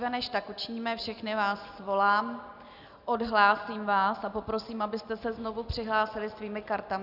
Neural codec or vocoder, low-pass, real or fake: none; 5.4 kHz; real